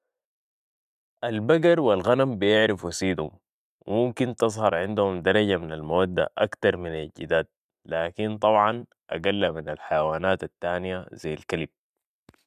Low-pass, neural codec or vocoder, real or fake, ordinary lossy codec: 14.4 kHz; none; real; none